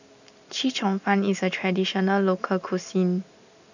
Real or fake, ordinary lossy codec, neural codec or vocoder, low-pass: real; none; none; 7.2 kHz